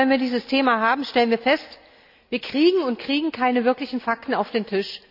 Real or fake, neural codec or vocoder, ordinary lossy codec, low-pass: real; none; none; 5.4 kHz